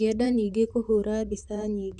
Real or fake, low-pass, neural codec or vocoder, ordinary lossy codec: fake; 10.8 kHz; vocoder, 44.1 kHz, 128 mel bands, Pupu-Vocoder; none